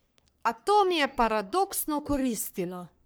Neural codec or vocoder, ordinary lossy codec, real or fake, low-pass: codec, 44.1 kHz, 3.4 kbps, Pupu-Codec; none; fake; none